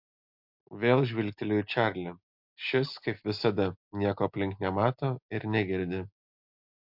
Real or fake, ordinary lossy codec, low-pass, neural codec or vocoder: real; MP3, 48 kbps; 5.4 kHz; none